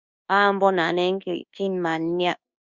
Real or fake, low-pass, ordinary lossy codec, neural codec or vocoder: fake; 7.2 kHz; Opus, 64 kbps; codec, 24 kHz, 1.2 kbps, DualCodec